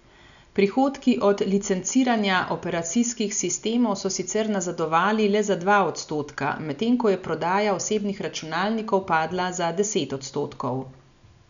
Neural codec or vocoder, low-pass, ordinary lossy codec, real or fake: none; 7.2 kHz; none; real